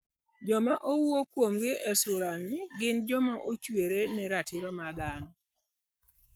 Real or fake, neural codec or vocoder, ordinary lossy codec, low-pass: fake; codec, 44.1 kHz, 7.8 kbps, Pupu-Codec; none; none